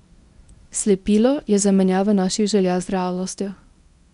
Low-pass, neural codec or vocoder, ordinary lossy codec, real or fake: 10.8 kHz; codec, 24 kHz, 0.9 kbps, WavTokenizer, medium speech release version 1; none; fake